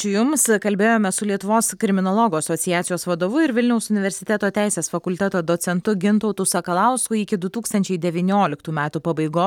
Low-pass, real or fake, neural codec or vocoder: 19.8 kHz; real; none